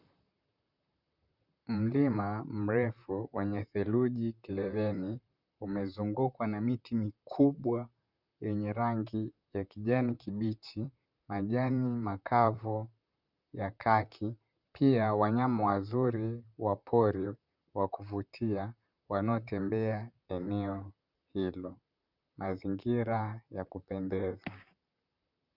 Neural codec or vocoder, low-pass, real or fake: vocoder, 44.1 kHz, 128 mel bands, Pupu-Vocoder; 5.4 kHz; fake